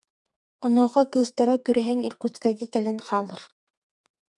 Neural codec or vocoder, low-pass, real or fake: codec, 32 kHz, 1.9 kbps, SNAC; 10.8 kHz; fake